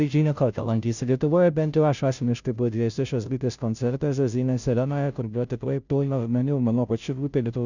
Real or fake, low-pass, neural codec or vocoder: fake; 7.2 kHz; codec, 16 kHz, 0.5 kbps, FunCodec, trained on Chinese and English, 25 frames a second